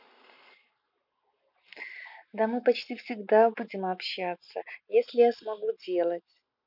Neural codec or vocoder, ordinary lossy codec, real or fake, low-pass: none; none; real; 5.4 kHz